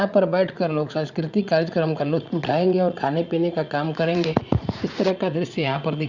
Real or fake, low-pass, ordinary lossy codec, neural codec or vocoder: fake; 7.2 kHz; Opus, 64 kbps; vocoder, 44.1 kHz, 80 mel bands, Vocos